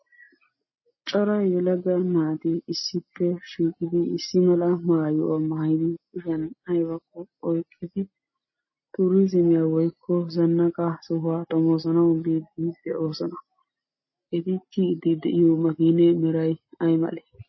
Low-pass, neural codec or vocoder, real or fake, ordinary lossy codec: 7.2 kHz; none; real; MP3, 24 kbps